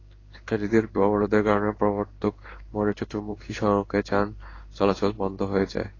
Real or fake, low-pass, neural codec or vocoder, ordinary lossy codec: fake; 7.2 kHz; codec, 16 kHz in and 24 kHz out, 1 kbps, XY-Tokenizer; AAC, 32 kbps